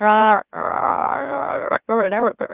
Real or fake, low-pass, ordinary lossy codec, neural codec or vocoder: fake; 3.6 kHz; Opus, 32 kbps; autoencoder, 44.1 kHz, a latent of 192 numbers a frame, MeloTTS